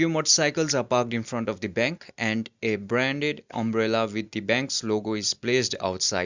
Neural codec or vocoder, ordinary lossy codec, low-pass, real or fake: none; Opus, 64 kbps; 7.2 kHz; real